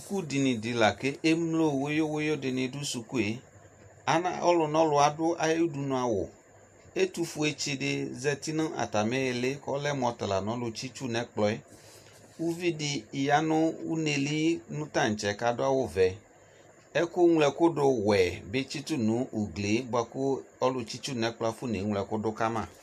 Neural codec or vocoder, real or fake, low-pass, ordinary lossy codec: none; real; 14.4 kHz; MP3, 64 kbps